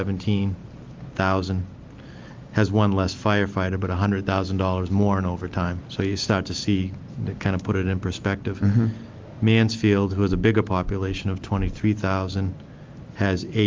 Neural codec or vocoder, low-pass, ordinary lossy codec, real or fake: none; 7.2 kHz; Opus, 32 kbps; real